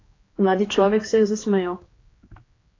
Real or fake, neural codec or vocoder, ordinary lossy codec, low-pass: fake; codec, 16 kHz, 2 kbps, X-Codec, HuBERT features, trained on general audio; AAC, 32 kbps; 7.2 kHz